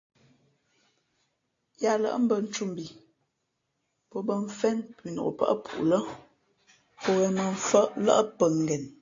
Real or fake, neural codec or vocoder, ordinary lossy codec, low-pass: real; none; AAC, 48 kbps; 7.2 kHz